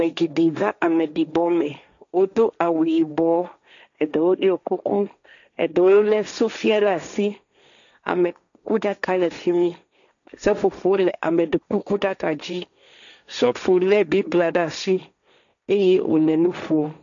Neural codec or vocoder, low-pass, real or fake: codec, 16 kHz, 1.1 kbps, Voila-Tokenizer; 7.2 kHz; fake